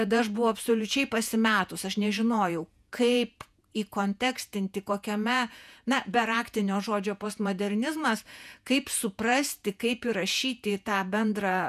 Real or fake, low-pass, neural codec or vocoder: fake; 14.4 kHz; vocoder, 48 kHz, 128 mel bands, Vocos